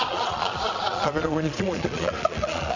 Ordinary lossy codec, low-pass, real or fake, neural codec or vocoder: Opus, 64 kbps; 7.2 kHz; fake; vocoder, 22.05 kHz, 80 mel bands, Vocos